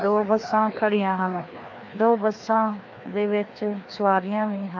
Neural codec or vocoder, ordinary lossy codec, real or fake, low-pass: codec, 16 kHz, 2 kbps, FreqCodec, larger model; AAC, 48 kbps; fake; 7.2 kHz